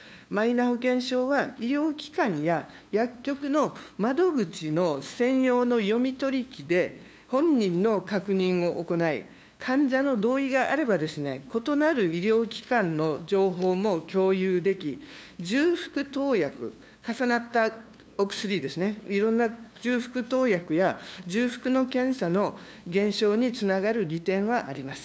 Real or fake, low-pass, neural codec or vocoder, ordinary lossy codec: fake; none; codec, 16 kHz, 2 kbps, FunCodec, trained on LibriTTS, 25 frames a second; none